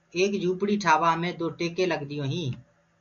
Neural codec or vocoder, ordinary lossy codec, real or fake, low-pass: none; MP3, 64 kbps; real; 7.2 kHz